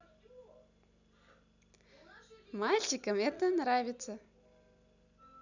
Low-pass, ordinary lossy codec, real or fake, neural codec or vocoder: 7.2 kHz; none; real; none